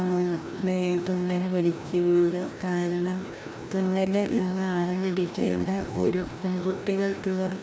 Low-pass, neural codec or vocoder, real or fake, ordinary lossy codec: none; codec, 16 kHz, 1 kbps, FreqCodec, larger model; fake; none